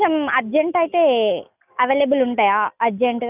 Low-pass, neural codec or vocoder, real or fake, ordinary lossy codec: 3.6 kHz; none; real; none